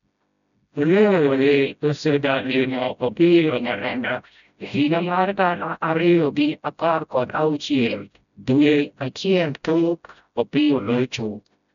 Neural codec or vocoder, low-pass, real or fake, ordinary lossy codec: codec, 16 kHz, 0.5 kbps, FreqCodec, smaller model; 7.2 kHz; fake; none